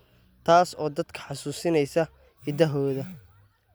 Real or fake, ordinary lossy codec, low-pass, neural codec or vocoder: real; none; none; none